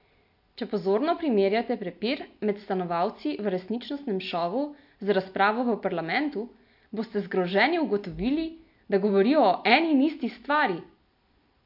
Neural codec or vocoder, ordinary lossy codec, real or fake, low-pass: none; MP3, 48 kbps; real; 5.4 kHz